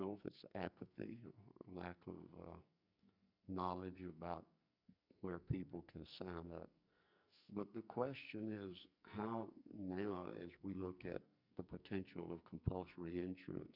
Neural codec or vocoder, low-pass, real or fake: codec, 44.1 kHz, 2.6 kbps, SNAC; 5.4 kHz; fake